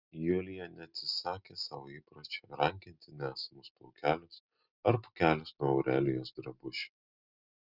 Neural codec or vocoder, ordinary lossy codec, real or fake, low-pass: none; Opus, 64 kbps; real; 5.4 kHz